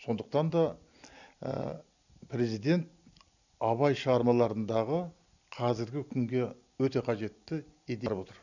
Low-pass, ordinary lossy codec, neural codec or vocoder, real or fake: 7.2 kHz; none; none; real